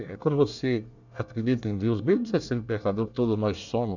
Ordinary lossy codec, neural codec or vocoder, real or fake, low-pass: none; codec, 24 kHz, 1 kbps, SNAC; fake; 7.2 kHz